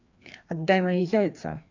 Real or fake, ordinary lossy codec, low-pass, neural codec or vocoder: fake; AAC, 48 kbps; 7.2 kHz; codec, 16 kHz, 2 kbps, FreqCodec, larger model